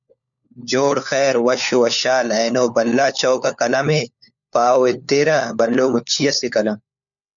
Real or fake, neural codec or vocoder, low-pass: fake; codec, 16 kHz, 4 kbps, FunCodec, trained on LibriTTS, 50 frames a second; 7.2 kHz